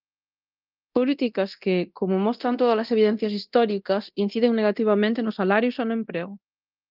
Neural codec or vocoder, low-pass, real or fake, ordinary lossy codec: codec, 24 kHz, 0.9 kbps, DualCodec; 5.4 kHz; fake; Opus, 32 kbps